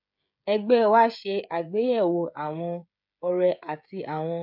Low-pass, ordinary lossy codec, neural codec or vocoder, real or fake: 5.4 kHz; MP3, 48 kbps; codec, 16 kHz, 16 kbps, FreqCodec, smaller model; fake